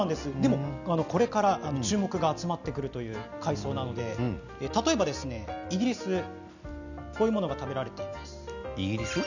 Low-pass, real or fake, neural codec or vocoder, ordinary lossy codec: 7.2 kHz; real; none; none